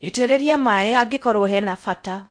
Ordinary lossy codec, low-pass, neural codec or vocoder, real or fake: AAC, 64 kbps; 9.9 kHz; codec, 16 kHz in and 24 kHz out, 0.6 kbps, FocalCodec, streaming, 4096 codes; fake